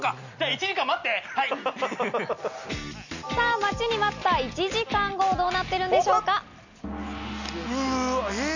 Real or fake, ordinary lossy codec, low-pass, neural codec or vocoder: real; none; 7.2 kHz; none